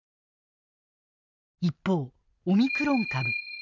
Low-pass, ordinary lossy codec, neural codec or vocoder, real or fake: 7.2 kHz; none; none; real